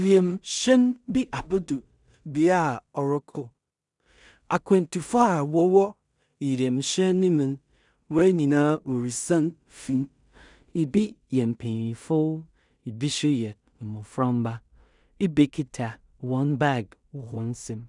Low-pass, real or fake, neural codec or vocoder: 10.8 kHz; fake; codec, 16 kHz in and 24 kHz out, 0.4 kbps, LongCat-Audio-Codec, two codebook decoder